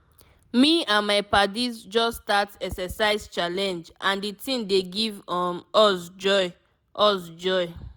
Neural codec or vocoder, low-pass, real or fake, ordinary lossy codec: none; none; real; none